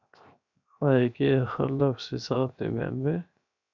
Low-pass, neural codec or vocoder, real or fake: 7.2 kHz; codec, 16 kHz, 0.7 kbps, FocalCodec; fake